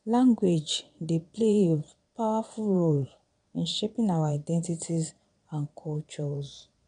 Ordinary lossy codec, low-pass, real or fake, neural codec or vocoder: none; 9.9 kHz; fake; vocoder, 22.05 kHz, 80 mel bands, Vocos